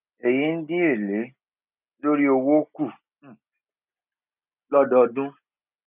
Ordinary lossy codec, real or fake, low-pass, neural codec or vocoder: none; real; 3.6 kHz; none